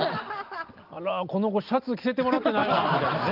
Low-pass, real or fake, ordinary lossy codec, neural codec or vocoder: 5.4 kHz; real; Opus, 32 kbps; none